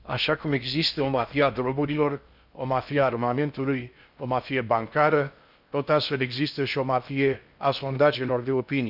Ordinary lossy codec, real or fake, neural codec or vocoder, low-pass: none; fake; codec, 16 kHz in and 24 kHz out, 0.6 kbps, FocalCodec, streaming, 4096 codes; 5.4 kHz